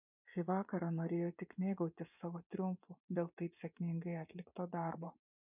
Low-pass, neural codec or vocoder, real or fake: 3.6 kHz; vocoder, 24 kHz, 100 mel bands, Vocos; fake